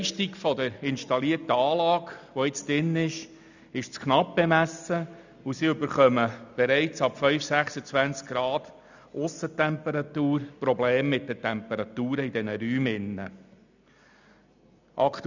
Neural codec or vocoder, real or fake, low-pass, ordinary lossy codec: none; real; 7.2 kHz; none